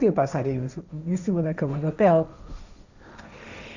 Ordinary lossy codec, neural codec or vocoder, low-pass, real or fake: none; codec, 16 kHz, 1.1 kbps, Voila-Tokenizer; 7.2 kHz; fake